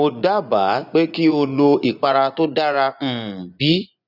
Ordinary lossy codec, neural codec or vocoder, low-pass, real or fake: none; vocoder, 24 kHz, 100 mel bands, Vocos; 5.4 kHz; fake